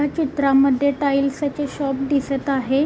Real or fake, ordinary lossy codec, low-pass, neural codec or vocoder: real; none; none; none